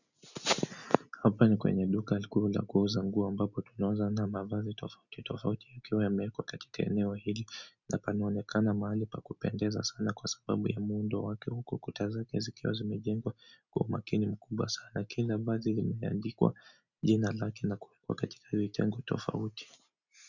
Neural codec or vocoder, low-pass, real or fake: none; 7.2 kHz; real